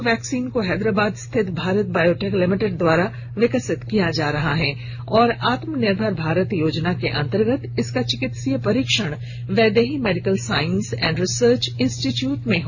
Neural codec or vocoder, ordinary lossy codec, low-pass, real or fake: none; none; 7.2 kHz; real